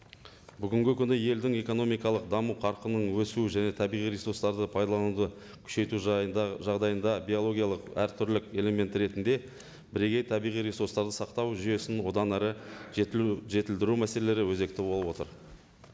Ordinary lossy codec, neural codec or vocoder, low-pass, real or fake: none; none; none; real